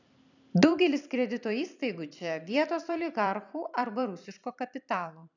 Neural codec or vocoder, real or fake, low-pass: vocoder, 44.1 kHz, 128 mel bands every 256 samples, BigVGAN v2; fake; 7.2 kHz